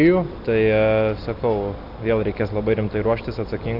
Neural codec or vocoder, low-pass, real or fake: none; 5.4 kHz; real